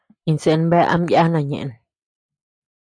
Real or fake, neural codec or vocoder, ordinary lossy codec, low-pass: real; none; AAC, 64 kbps; 9.9 kHz